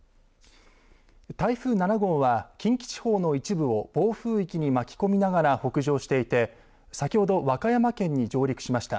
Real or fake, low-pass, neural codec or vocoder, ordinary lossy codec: real; none; none; none